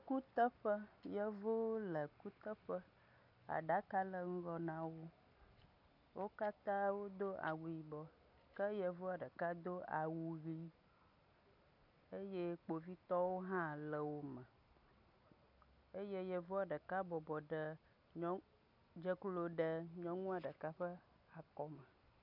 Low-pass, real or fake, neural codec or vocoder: 5.4 kHz; real; none